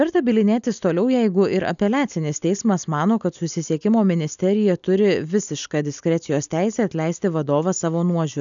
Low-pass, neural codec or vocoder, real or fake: 7.2 kHz; none; real